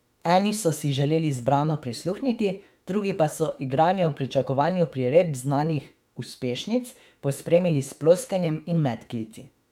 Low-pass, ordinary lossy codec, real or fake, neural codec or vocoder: 19.8 kHz; MP3, 96 kbps; fake; autoencoder, 48 kHz, 32 numbers a frame, DAC-VAE, trained on Japanese speech